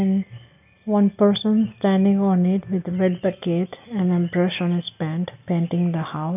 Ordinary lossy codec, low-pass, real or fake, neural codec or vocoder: none; 3.6 kHz; real; none